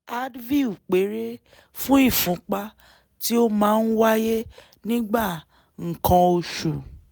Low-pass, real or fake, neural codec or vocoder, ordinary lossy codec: none; real; none; none